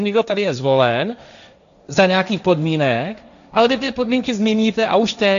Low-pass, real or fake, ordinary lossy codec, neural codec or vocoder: 7.2 kHz; fake; MP3, 96 kbps; codec, 16 kHz, 1.1 kbps, Voila-Tokenizer